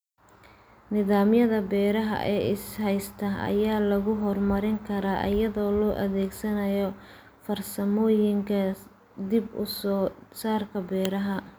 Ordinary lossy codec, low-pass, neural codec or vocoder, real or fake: none; none; none; real